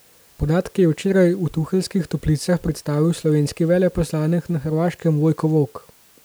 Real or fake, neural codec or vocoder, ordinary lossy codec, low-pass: real; none; none; none